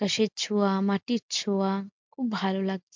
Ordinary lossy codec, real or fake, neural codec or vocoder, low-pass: MP3, 64 kbps; real; none; 7.2 kHz